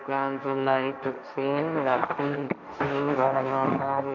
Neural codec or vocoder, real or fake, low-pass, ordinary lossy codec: codec, 16 kHz, 1.1 kbps, Voila-Tokenizer; fake; 7.2 kHz; none